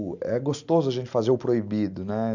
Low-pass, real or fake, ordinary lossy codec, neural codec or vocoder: 7.2 kHz; real; none; none